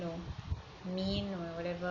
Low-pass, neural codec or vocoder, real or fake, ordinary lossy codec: 7.2 kHz; none; real; none